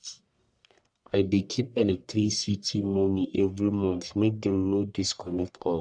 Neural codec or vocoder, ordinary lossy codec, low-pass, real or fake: codec, 44.1 kHz, 1.7 kbps, Pupu-Codec; none; 9.9 kHz; fake